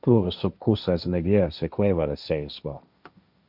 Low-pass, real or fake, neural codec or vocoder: 5.4 kHz; fake; codec, 16 kHz, 1.1 kbps, Voila-Tokenizer